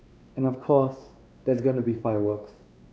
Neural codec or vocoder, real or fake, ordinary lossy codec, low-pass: codec, 16 kHz, 2 kbps, X-Codec, WavLM features, trained on Multilingual LibriSpeech; fake; none; none